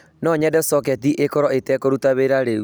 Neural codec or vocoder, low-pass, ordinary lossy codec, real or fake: none; none; none; real